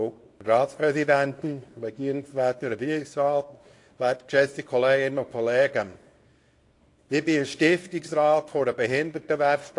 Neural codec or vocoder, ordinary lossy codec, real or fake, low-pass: codec, 24 kHz, 0.9 kbps, WavTokenizer, small release; AAC, 48 kbps; fake; 10.8 kHz